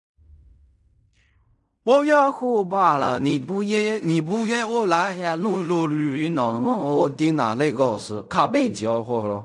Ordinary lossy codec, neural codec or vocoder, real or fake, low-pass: none; codec, 16 kHz in and 24 kHz out, 0.4 kbps, LongCat-Audio-Codec, fine tuned four codebook decoder; fake; 10.8 kHz